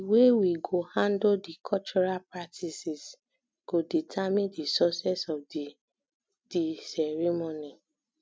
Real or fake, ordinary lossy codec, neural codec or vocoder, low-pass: real; none; none; none